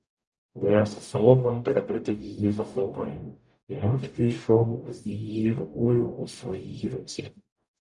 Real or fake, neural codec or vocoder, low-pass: fake; codec, 44.1 kHz, 0.9 kbps, DAC; 10.8 kHz